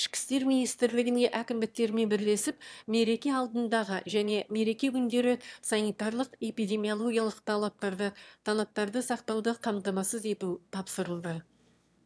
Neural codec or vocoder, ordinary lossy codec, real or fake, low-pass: autoencoder, 22.05 kHz, a latent of 192 numbers a frame, VITS, trained on one speaker; none; fake; none